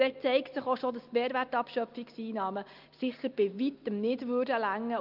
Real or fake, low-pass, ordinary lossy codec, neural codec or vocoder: real; 5.4 kHz; Opus, 24 kbps; none